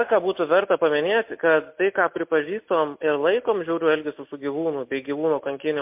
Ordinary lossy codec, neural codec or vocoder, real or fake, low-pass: MP3, 32 kbps; none; real; 3.6 kHz